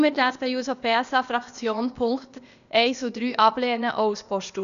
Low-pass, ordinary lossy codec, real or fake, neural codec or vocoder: 7.2 kHz; none; fake; codec, 16 kHz, 0.8 kbps, ZipCodec